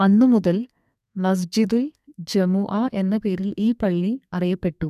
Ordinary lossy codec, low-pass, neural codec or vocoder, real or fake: none; 14.4 kHz; codec, 32 kHz, 1.9 kbps, SNAC; fake